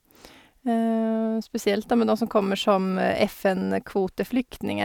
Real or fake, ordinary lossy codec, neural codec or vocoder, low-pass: real; none; none; 19.8 kHz